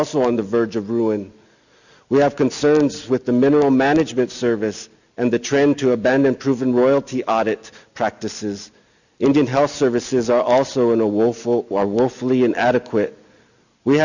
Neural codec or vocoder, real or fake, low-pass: none; real; 7.2 kHz